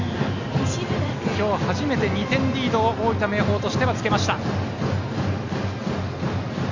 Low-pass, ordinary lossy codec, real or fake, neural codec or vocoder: 7.2 kHz; Opus, 64 kbps; real; none